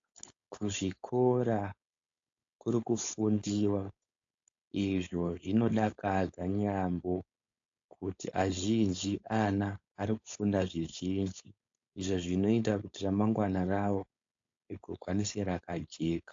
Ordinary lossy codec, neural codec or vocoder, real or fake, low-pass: AAC, 48 kbps; codec, 16 kHz, 4.8 kbps, FACodec; fake; 7.2 kHz